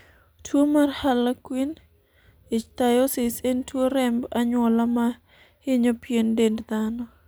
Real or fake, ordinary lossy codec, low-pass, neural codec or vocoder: real; none; none; none